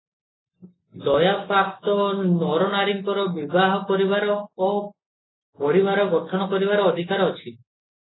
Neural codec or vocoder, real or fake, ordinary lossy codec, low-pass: none; real; AAC, 16 kbps; 7.2 kHz